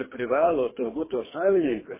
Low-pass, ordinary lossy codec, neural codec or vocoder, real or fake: 3.6 kHz; MP3, 16 kbps; codec, 24 kHz, 3 kbps, HILCodec; fake